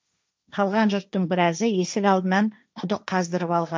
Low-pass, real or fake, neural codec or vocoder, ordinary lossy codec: none; fake; codec, 16 kHz, 1.1 kbps, Voila-Tokenizer; none